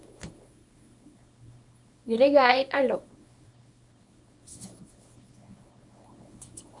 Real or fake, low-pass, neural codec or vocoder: fake; 10.8 kHz; codec, 24 kHz, 0.9 kbps, WavTokenizer, small release